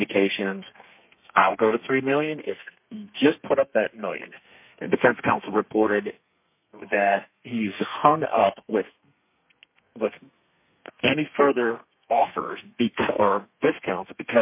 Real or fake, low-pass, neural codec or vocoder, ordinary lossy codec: fake; 3.6 kHz; codec, 32 kHz, 1.9 kbps, SNAC; MP3, 32 kbps